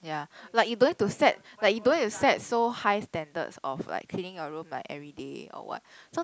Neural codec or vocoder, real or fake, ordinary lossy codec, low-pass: none; real; none; none